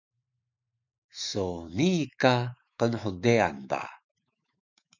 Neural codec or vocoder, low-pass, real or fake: autoencoder, 48 kHz, 128 numbers a frame, DAC-VAE, trained on Japanese speech; 7.2 kHz; fake